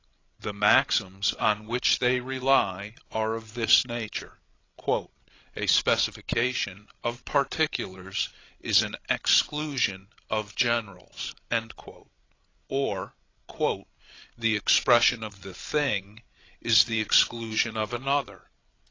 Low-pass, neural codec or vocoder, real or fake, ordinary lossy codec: 7.2 kHz; codec, 16 kHz, 16 kbps, FreqCodec, larger model; fake; AAC, 32 kbps